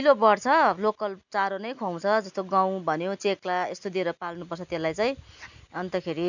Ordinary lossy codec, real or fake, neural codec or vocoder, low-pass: none; real; none; 7.2 kHz